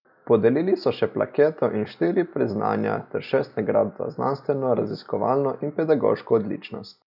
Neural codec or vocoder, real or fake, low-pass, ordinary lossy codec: none; real; 5.4 kHz; none